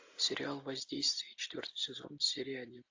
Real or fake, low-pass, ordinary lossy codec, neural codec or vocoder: real; 7.2 kHz; AAC, 48 kbps; none